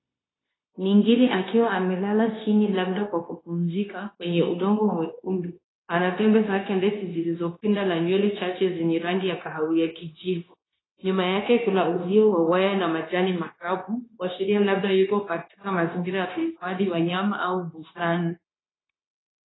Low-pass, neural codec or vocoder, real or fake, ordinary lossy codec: 7.2 kHz; codec, 16 kHz, 0.9 kbps, LongCat-Audio-Codec; fake; AAC, 16 kbps